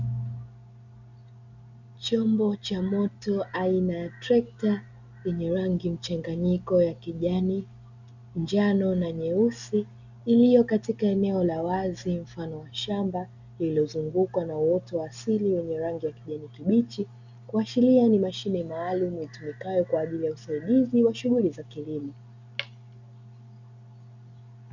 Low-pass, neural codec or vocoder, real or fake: 7.2 kHz; none; real